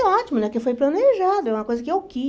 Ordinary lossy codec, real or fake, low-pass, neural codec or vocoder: none; real; none; none